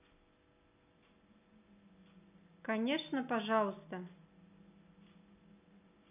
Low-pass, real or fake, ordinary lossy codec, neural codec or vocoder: 3.6 kHz; real; none; none